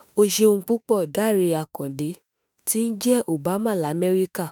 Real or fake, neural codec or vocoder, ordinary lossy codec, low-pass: fake; autoencoder, 48 kHz, 32 numbers a frame, DAC-VAE, trained on Japanese speech; none; none